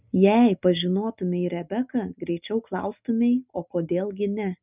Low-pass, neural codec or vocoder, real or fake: 3.6 kHz; none; real